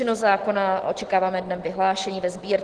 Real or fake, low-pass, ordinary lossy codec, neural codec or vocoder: real; 10.8 kHz; Opus, 16 kbps; none